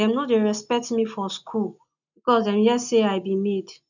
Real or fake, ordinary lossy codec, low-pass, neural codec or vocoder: real; none; 7.2 kHz; none